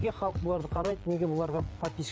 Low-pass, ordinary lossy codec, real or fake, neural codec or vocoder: none; none; fake; codec, 16 kHz, 8 kbps, FreqCodec, larger model